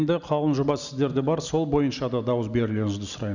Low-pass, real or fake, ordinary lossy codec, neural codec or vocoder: 7.2 kHz; real; none; none